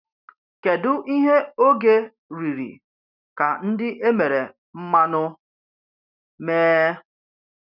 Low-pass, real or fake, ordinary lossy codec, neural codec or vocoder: 5.4 kHz; real; none; none